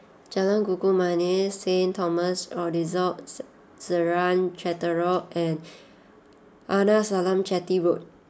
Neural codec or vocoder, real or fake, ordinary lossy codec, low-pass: none; real; none; none